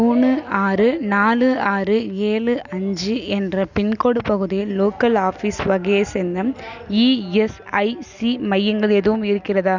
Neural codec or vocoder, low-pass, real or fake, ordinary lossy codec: none; 7.2 kHz; real; none